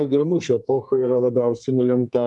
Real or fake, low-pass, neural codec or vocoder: fake; 10.8 kHz; codec, 32 kHz, 1.9 kbps, SNAC